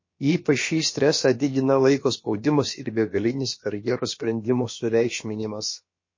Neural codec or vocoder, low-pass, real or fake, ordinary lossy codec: codec, 16 kHz, about 1 kbps, DyCAST, with the encoder's durations; 7.2 kHz; fake; MP3, 32 kbps